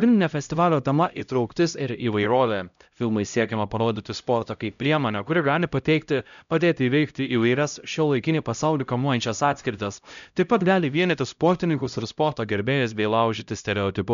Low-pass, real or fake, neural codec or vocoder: 7.2 kHz; fake; codec, 16 kHz, 0.5 kbps, X-Codec, HuBERT features, trained on LibriSpeech